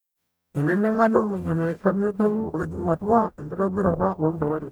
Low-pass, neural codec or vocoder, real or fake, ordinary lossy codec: none; codec, 44.1 kHz, 0.9 kbps, DAC; fake; none